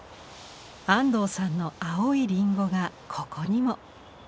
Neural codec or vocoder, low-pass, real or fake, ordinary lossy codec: none; none; real; none